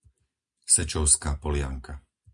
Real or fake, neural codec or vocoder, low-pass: real; none; 10.8 kHz